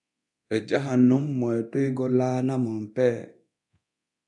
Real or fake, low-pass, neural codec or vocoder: fake; 10.8 kHz; codec, 24 kHz, 0.9 kbps, DualCodec